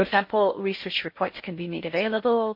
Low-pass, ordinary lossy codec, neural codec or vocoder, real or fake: 5.4 kHz; MP3, 32 kbps; codec, 16 kHz in and 24 kHz out, 0.6 kbps, FocalCodec, streaming, 2048 codes; fake